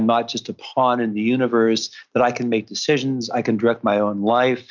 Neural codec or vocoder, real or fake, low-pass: none; real; 7.2 kHz